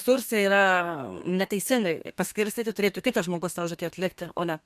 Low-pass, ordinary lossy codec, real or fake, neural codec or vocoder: 14.4 kHz; MP3, 96 kbps; fake; codec, 32 kHz, 1.9 kbps, SNAC